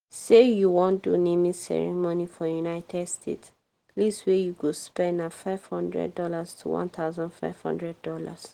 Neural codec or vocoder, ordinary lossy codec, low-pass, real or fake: none; Opus, 16 kbps; 19.8 kHz; real